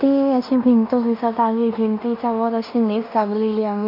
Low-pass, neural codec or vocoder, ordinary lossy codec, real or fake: 5.4 kHz; codec, 16 kHz in and 24 kHz out, 0.9 kbps, LongCat-Audio-Codec, fine tuned four codebook decoder; none; fake